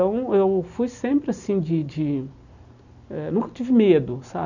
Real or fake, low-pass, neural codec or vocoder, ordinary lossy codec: real; 7.2 kHz; none; none